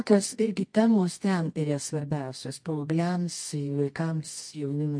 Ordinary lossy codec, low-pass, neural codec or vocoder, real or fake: MP3, 48 kbps; 9.9 kHz; codec, 24 kHz, 0.9 kbps, WavTokenizer, medium music audio release; fake